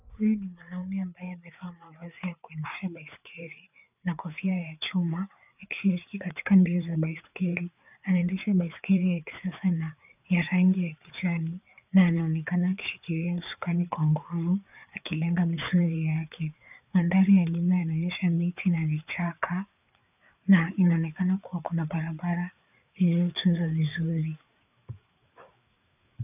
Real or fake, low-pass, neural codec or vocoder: fake; 3.6 kHz; codec, 16 kHz, 4 kbps, FreqCodec, larger model